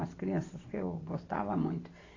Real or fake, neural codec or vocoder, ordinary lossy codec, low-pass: real; none; AAC, 32 kbps; 7.2 kHz